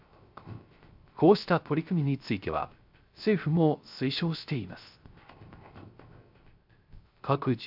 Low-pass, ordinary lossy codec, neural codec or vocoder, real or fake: 5.4 kHz; AAC, 48 kbps; codec, 16 kHz, 0.3 kbps, FocalCodec; fake